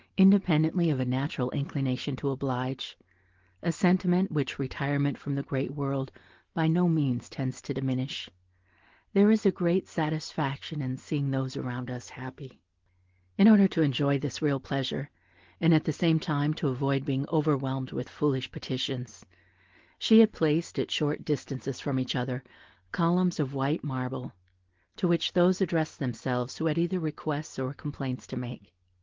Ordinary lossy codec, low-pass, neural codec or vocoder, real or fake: Opus, 16 kbps; 7.2 kHz; none; real